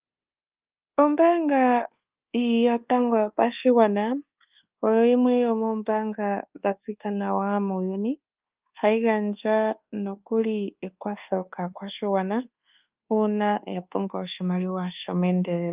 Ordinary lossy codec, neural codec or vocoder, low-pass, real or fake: Opus, 32 kbps; codec, 24 kHz, 1.2 kbps, DualCodec; 3.6 kHz; fake